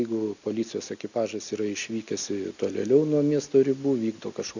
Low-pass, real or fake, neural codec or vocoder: 7.2 kHz; real; none